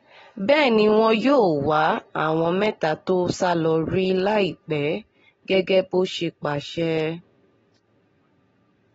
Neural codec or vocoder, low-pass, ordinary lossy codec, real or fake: none; 19.8 kHz; AAC, 24 kbps; real